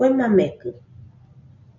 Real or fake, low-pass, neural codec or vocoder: real; 7.2 kHz; none